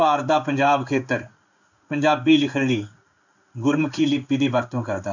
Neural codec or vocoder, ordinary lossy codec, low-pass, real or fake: codec, 16 kHz in and 24 kHz out, 1 kbps, XY-Tokenizer; none; 7.2 kHz; fake